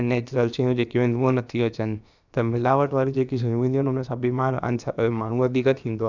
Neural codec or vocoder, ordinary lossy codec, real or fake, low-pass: codec, 16 kHz, about 1 kbps, DyCAST, with the encoder's durations; none; fake; 7.2 kHz